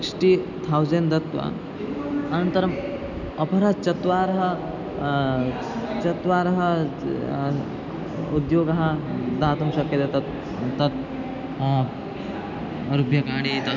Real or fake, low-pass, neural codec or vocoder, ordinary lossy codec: real; 7.2 kHz; none; none